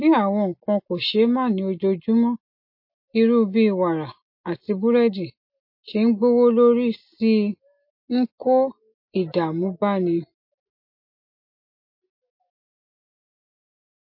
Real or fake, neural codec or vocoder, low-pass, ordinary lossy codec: real; none; 5.4 kHz; MP3, 32 kbps